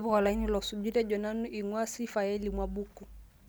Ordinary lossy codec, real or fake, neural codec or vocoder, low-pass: none; real; none; none